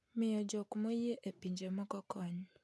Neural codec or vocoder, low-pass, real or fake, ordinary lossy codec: none; none; real; none